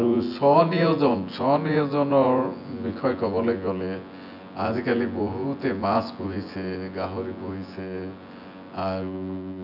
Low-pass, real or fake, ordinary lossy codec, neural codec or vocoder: 5.4 kHz; fake; none; vocoder, 24 kHz, 100 mel bands, Vocos